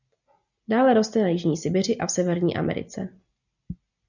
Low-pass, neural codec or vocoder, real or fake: 7.2 kHz; none; real